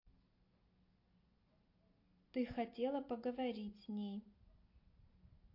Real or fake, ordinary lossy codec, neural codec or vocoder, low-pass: real; MP3, 32 kbps; none; 5.4 kHz